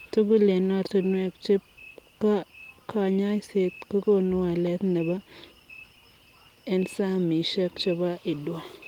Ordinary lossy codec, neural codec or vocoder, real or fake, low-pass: Opus, 24 kbps; none; real; 19.8 kHz